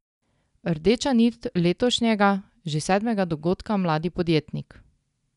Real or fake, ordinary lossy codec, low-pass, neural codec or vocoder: real; none; 10.8 kHz; none